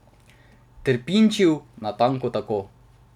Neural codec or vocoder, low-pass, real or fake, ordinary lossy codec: none; 19.8 kHz; real; none